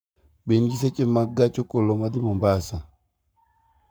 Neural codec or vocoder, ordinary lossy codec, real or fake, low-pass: codec, 44.1 kHz, 7.8 kbps, Pupu-Codec; none; fake; none